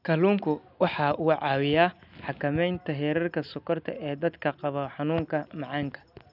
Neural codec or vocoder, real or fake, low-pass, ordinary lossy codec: none; real; 5.4 kHz; none